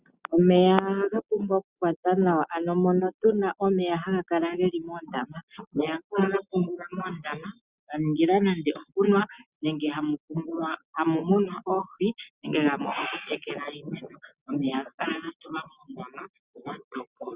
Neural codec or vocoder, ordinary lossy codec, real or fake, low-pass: none; Opus, 64 kbps; real; 3.6 kHz